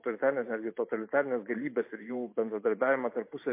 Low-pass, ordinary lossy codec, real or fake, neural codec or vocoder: 3.6 kHz; MP3, 24 kbps; fake; vocoder, 44.1 kHz, 128 mel bands every 512 samples, BigVGAN v2